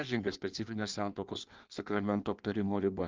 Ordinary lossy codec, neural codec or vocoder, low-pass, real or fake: Opus, 32 kbps; codec, 16 kHz in and 24 kHz out, 1.1 kbps, FireRedTTS-2 codec; 7.2 kHz; fake